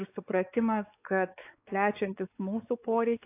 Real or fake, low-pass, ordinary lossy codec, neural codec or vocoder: fake; 3.6 kHz; AAC, 24 kbps; codec, 16 kHz, 4 kbps, X-Codec, WavLM features, trained on Multilingual LibriSpeech